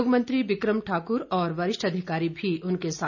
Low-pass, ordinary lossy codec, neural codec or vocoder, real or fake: 7.2 kHz; none; none; real